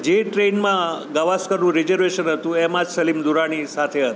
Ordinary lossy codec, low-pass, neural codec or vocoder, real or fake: none; none; none; real